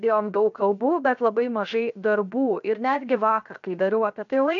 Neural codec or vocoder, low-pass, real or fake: codec, 16 kHz, 0.7 kbps, FocalCodec; 7.2 kHz; fake